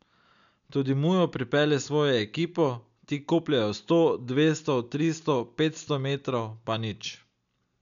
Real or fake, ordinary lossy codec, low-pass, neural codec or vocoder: real; none; 7.2 kHz; none